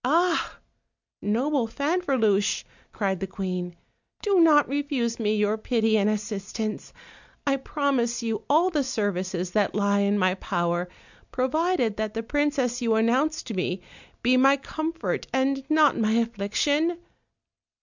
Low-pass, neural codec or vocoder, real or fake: 7.2 kHz; none; real